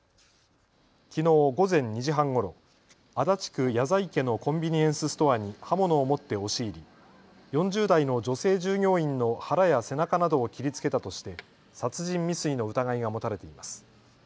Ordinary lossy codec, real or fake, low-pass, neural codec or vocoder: none; real; none; none